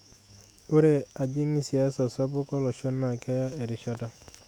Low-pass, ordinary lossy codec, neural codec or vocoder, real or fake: 19.8 kHz; none; autoencoder, 48 kHz, 128 numbers a frame, DAC-VAE, trained on Japanese speech; fake